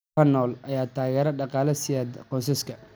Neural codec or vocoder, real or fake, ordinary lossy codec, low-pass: none; real; none; none